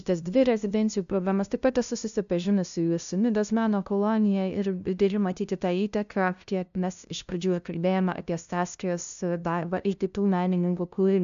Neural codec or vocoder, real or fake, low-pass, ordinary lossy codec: codec, 16 kHz, 0.5 kbps, FunCodec, trained on LibriTTS, 25 frames a second; fake; 7.2 kHz; AAC, 96 kbps